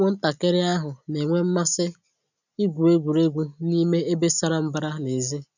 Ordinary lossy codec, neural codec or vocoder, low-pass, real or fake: none; none; 7.2 kHz; real